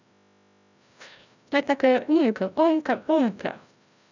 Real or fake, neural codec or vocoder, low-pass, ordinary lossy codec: fake; codec, 16 kHz, 0.5 kbps, FreqCodec, larger model; 7.2 kHz; none